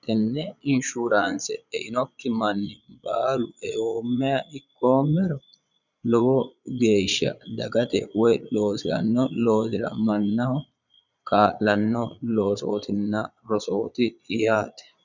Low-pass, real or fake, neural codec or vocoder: 7.2 kHz; fake; vocoder, 22.05 kHz, 80 mel bands, Vocos